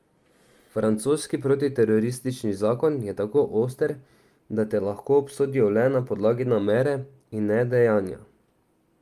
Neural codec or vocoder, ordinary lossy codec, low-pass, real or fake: none; Opus, 32 kbps; 14.4 kHz; real